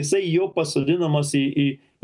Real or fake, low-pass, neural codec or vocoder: real; 10.8 kHz; none